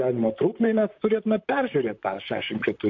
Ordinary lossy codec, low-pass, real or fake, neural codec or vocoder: MP3, 48 kbps; 7.2 kHz; fake; vocoder, 44.1 kHz, 128 mel bands, Pupu-Vocoder